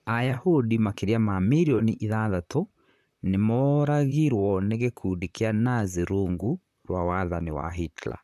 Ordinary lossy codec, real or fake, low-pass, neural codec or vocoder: none; fake; 14.4 kHz; vocoder, 44.1 kHz, 128 mel bands, Pupu-Vocoder